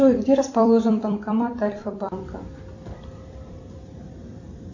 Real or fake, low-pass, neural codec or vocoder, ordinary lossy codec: fake; 7.2 kHz; vocoder, 44.1 kHz, 128 mel bands every 256 samples, BigVGAN v2; AAC, 48 kbps